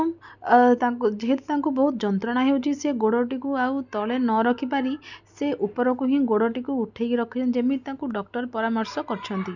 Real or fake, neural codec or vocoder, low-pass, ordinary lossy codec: real; none; 7.2 kHz; none